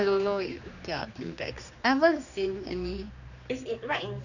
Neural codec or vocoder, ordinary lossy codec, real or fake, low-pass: codec, 16 kHz, 2 kbps, X-Codec, HuBERT features, trained on general audio; none; fake; 7.2 kHz